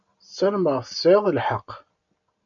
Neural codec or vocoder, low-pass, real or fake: none; 7.2 kHz; real